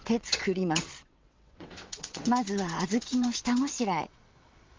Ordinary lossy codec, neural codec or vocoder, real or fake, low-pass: Opus, 24 kbps; vocoder, 22.05 kHz, 80 mel bands, WaveNeXt; fake; 7.2 kHz